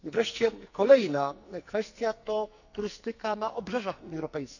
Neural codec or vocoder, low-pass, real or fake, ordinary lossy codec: codec, 44.1 kHz, 2.6 kbps, SNAC; 7.2 kHz; fake; none